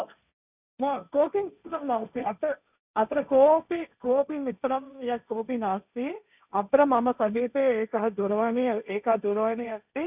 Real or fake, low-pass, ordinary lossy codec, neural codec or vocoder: fake; 3.6 kHz; none; codec, 16 kHz, 1.1 kbps, Voila-Tokenizer